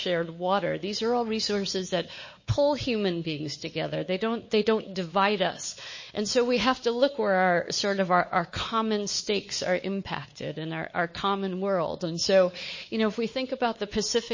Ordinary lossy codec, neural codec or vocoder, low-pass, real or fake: MP3, 32 kbps; codec, 16 kHz, 4 kbps, X-Codec, WavLM features, trained on Multilingual LibriSpeech; 7.2 kHz; fake